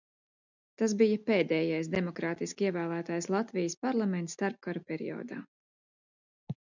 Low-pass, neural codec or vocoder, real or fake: 7.2 kHz; none; real